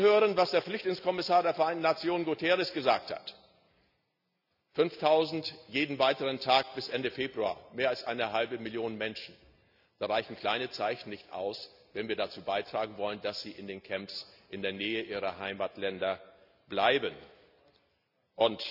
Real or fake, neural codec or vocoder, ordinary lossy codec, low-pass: real; none; none; 5.4 kHz